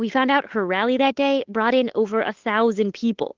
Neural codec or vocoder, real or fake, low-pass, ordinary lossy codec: codec, 16 kHz, 8 kbps, FunCodec, trained on Chinese and English, 25 frames a second; fake; 7.2 kHz; Opus, 16 kbps